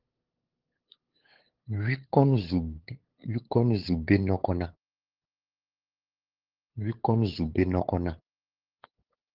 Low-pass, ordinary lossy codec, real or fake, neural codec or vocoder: 5.4 kHz; Opus, 16 kbps; fake; codec, 16 kHz, 8 kbps, FunCodec, trained on LibriTTS, 25 frames a second